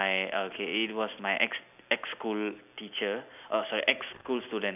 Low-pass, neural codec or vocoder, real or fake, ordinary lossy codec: 3.6 kHz; none; real; none